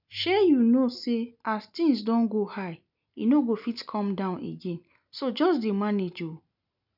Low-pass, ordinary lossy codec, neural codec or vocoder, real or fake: 5.4 kHz; none; none; real